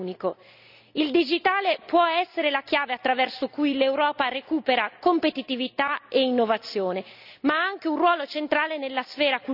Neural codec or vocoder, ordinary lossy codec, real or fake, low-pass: none; none; real; 5.4 kHz